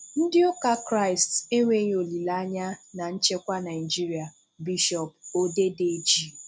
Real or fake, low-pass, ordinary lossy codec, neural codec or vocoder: real; none; none; none